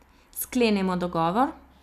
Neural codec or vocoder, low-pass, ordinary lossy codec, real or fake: none; 14.4 kHz; none; real